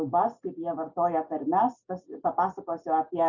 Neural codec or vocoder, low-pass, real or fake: none; 7.2 kHz; real